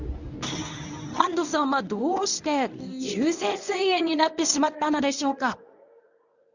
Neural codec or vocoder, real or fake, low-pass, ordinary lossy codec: codec, 24 kHz, 0.9 kbps, WavTokenizer, medium speech release version 1; fake; 7.2 kHz; none